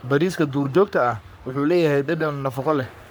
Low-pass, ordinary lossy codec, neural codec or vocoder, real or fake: none; none; codec, 44.1 kHz, 3.4 kbps, Pupu-Codec; fake